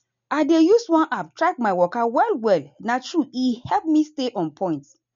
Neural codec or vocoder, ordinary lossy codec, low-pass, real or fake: none; MP3, 64 kbps; 7.2 kHz; real